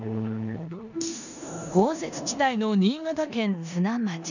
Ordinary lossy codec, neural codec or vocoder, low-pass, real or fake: none; codec, 16 kHz in and 24 kHz out, 0.9 kbps, LongCat-Audio-Codec, four codebook decoder; 7.2 kHz; fake